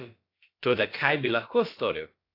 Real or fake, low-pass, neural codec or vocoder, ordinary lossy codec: fake; 5.4 kHz; codec, 16 kHz, about 1 kbps, DyCAST, with the encoder's durations; AAC, 32 kbps